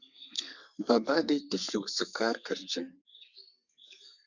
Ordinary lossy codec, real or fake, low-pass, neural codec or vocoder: Opus, 64 kbps; fake; 7.2 kHz; codec, 32 kHz, 1.9 kbps, SNAC